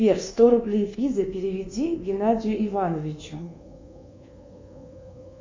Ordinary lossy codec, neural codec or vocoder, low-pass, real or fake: MP3, 48 kbps; codec, 24 kHz, 1.2 kbps, DualCodec; 7.2 kHz; fake